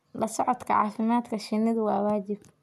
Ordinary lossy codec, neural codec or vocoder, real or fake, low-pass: none; none; real; 14.4 kHz